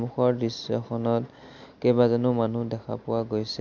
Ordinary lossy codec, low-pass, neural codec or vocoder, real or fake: none; 7.2 kHz; none; real